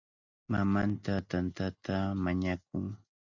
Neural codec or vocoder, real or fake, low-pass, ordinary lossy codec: none; real; 7.2 kHz; Opus, 64 kbps